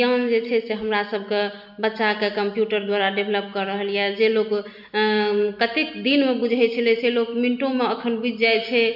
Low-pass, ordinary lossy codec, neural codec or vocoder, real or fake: 5.4 kHz; none; none; real